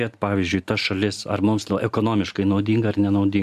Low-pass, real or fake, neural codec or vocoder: 14.4 kHz; real; none